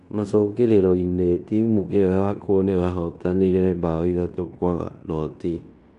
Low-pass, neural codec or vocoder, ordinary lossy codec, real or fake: 10.8 kHz; codec, 16 kHz in and 24 kHz out, 0.9 kbps, LongCat-Audio-Codec, fine tuned four codebook decoder; none; fake